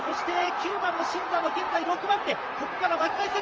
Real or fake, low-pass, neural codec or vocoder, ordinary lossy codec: fake; 7.2 kHz; vocoder, 44.1 kHz, 128 mel bands, Pupu-Vocoder; Opus, 24 kbps